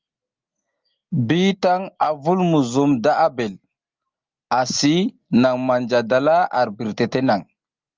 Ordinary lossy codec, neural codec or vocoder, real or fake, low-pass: Opus, 32 kbps; none; real; 7.2 kHz